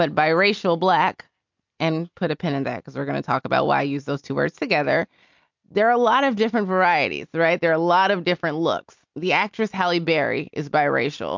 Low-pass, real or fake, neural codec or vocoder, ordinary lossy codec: 7.2 kHz; real; none; MP3, 64 kbps